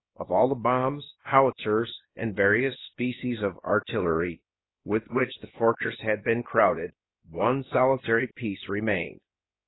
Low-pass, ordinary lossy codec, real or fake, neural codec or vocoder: 7.2 kHz; AAC, 16 kbps; fake; codec, 16 kHz, about 1 kbps, DyCAST, with the encoder's durations